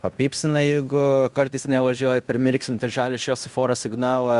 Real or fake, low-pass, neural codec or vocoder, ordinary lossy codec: fake; 10.8 kHz; codec, 16 kHz in and 24 kHz out, 0.9 kbps, LongCat-Audio-Codec, fine tuned four codebook decoder; Opus, 64 kbps